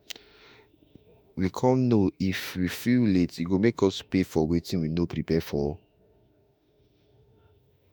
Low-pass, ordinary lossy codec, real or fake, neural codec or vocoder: none; none; fake; autoencoder, 48 kHz, 32 numbers a frame, DAC-VAE, trained on Japanese speech